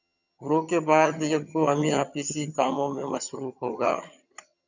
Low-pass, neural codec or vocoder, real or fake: 7.2 kHz; vocoder, 22.05 kHz, 80 mel bands, HiFi-GAN; fake